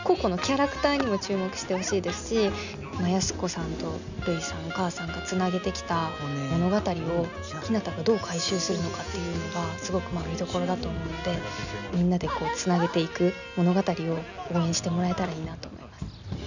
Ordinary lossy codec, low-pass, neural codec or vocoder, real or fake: none; 7.2 kHz; none; real